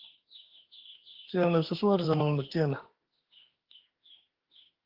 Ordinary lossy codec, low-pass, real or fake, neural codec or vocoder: Opus, 16 kbps; 5.4 kHz; fake; autoencoder, 48 kHz, 32 numbers a frame, DAC-VAE, trained on Japanese speech